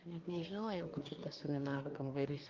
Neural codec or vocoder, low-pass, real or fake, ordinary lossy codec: codec, 24 kHz, 1 kbps, SNAC; 7.2 kHz; fake; Opus, 32 kbps